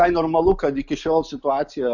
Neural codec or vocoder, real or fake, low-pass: none; real; 7.2 kHz